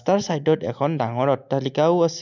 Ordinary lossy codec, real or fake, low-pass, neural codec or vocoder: none; real; 7.2 kHz; none